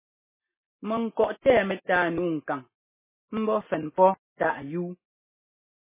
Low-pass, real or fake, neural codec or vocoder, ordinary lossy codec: 3.6 kHz; real; none; MP3, 16 kbps